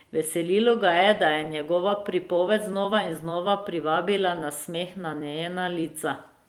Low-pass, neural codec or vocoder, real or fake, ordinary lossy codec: 19.8 kHz; vocoder, 44.1 kHz, 128 mel bands every 256 samples, BigVGAN v2; fake; Opus, 24 kbps